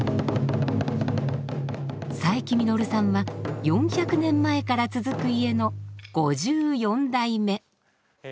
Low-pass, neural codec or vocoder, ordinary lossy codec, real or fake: none; none; none; real